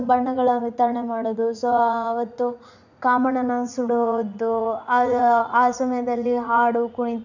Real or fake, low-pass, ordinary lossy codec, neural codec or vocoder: fake; 7.2 kHz; none; vocoder, 44.1 kHz, 80 mel bands, Vocos